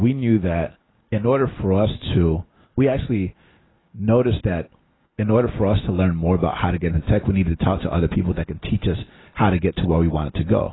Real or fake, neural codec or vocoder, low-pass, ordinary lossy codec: real; none; 7.2 kHz; AAC, 16 kbps